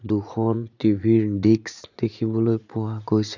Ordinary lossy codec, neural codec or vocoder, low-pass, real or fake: none; vocoder, 44.1 kHz, 80 mel bands, Vocos; 7.2 kHz; fake